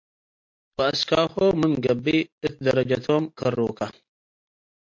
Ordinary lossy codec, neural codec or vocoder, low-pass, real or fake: MP3, 48 kbps; none; 7.2 kHz; real